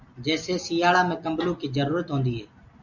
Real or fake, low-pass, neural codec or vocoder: real; 7.2 kHz; none